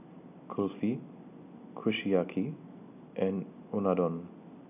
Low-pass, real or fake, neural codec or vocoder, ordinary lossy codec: 3.6 kHz; real; none; none